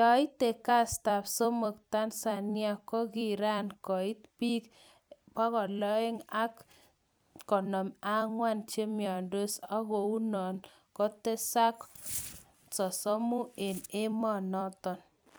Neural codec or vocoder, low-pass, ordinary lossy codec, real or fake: vocoder, 44.1 kHz, 128 mel bands every 256 samples, BigVGAN v2; none; none; fake